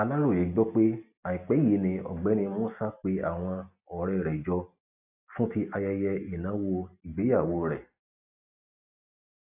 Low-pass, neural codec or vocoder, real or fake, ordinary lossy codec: 3.6 kHz; none; real; AAC, 32 kbps